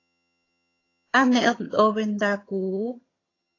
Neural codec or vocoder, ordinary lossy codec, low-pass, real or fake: vocoder, 22.05 kHz, 80 mel bands, HiFi-GAN; AAC, 32 kbps; 7.2 kHz; fake